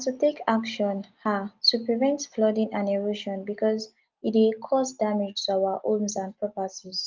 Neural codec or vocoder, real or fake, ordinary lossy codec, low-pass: none; real; Opus, 32 kbps; 7.2 kHz